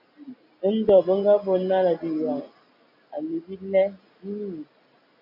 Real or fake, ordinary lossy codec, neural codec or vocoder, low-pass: real; AAC, 32 kbps; none; 5.4 kHz